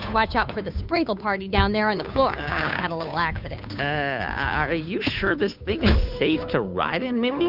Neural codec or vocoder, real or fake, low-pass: codec, 16 kHz, 2 kbps, FunCodec, trained on Chinese and English, 25 frames a second; fake; 5.4 kHz